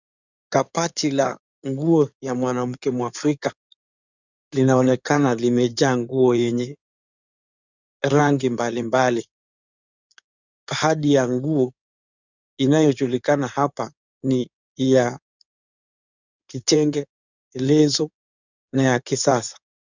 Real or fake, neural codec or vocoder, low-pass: fake; codec, 16 kHz in and 24 kHz out, 2.2 kbps, FireRedTTS-2 codec; 7.2 kHz